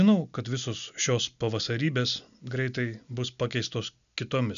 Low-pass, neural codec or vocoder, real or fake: 7.2 kHz; none; real